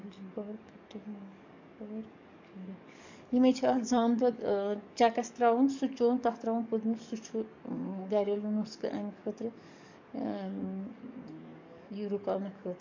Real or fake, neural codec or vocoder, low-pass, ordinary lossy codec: fake; codec, 44.1 kHz, 7.8 kbps, DAC; 7.2 kHz; MP3, 64 kbps